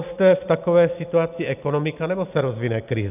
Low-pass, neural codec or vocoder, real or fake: 3.6 kHz; none; real